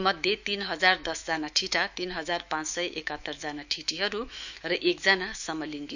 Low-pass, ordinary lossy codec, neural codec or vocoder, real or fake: 7.2 kHz; none; autoencoder, 48 kHz, 128 numbers a frame, DAC-VAE, trained on Japanese speech; fake